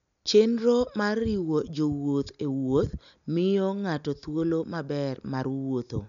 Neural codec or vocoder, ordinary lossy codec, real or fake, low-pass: none; none; real; 7.2 kHz